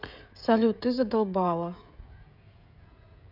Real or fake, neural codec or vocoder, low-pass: fake; codec, 16 kHz, 16 kbps, FreqCodec, smaller model; 5.4 kHz